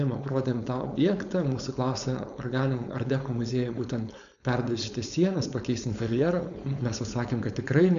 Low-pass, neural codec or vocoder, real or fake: 7.2 kHz; codec, 16 kHz, 4.8 kbps, FACodec; fake